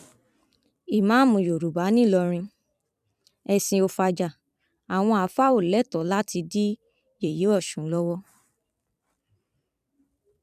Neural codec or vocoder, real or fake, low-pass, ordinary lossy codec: vocoder, 44.1 kHz, 128 mel bands every 256 samples, BigVGAN v2; fake; 14.4 kHz; none